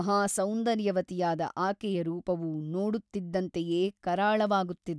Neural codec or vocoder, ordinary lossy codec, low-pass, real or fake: none; none; none; real